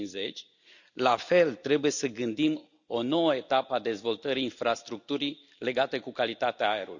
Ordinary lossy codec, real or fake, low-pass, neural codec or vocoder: none; real; 7.2 kHz; none